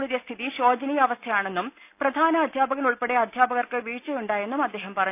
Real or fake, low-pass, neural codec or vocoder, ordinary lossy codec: real; 3.6 kHz; none; none